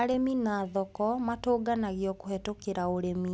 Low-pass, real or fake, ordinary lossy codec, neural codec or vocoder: none; real; none; none